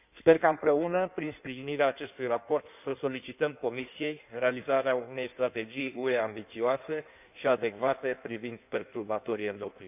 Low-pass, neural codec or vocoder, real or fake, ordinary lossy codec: 3.6 kHz; codec, 16 kHz in and 24 kHz out, 1.1 kbps, FireRedTTS-2 codec; fake; none